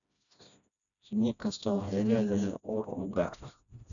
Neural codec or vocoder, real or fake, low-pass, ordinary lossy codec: codec, 16 kHz, 1 kbps, FreqCodec, smaller model; fake; 7.2 kHz; none